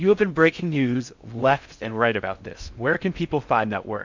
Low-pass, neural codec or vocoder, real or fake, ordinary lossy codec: 7.2 kHz; codec, 16 kHz in and 24 kHz out, 0.6 kbps, FocalCodec, streaming, 4096 codes; fake; MP3, 64 kbps